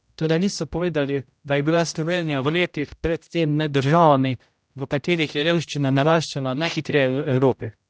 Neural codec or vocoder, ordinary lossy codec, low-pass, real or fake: codec, 16 kHz, 0.5 kbps, X-Codec, HuBERT features, trained on general audio; none; none; fake